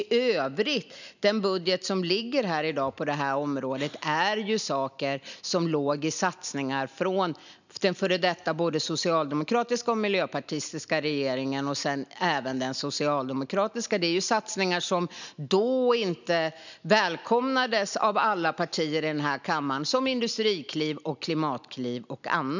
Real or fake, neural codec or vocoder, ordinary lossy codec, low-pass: real; none; none; 7.2 kHz